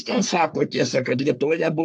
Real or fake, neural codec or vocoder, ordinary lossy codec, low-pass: fake; codec, 44.1 kHz, 3.4 kbps, Pupu-Codec; AAC, 64 kbps; 10.8 kHz